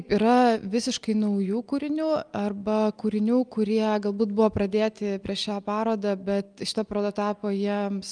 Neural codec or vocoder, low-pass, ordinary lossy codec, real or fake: none; 9.9 kHz; Opus, 32 kbps; real